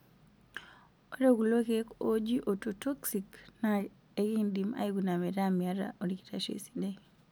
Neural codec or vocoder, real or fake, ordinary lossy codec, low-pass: none; real; none; none